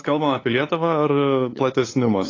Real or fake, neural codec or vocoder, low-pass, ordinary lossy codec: fake; codec, 16 kHz, 8 kbps, FunCodec, trained on LibriTTS, 25 frames a second; 7.2 kHz; AAC, 32 kbps